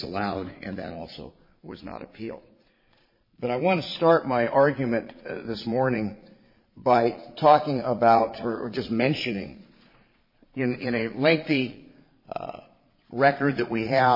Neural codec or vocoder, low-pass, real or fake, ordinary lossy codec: vocoder, 22.05 kHz, 80 mel bands, Vocos; 5.4 kHz; fake; MP3, 24 kbps